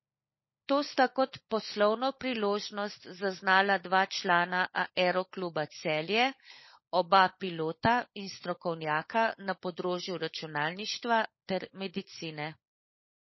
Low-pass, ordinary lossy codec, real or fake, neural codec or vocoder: 7.2 kHz; MP3, 24 kbps; fake; codec, 16 kHz, 16 kbps, FunCodec, trained on LibriTTS, 50 frames a second